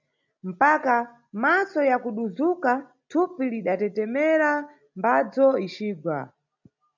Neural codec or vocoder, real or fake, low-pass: none; real; 7.2 kHz